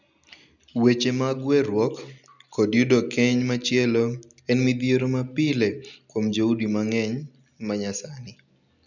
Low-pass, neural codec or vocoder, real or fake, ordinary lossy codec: 7.2 kHz; none; real; none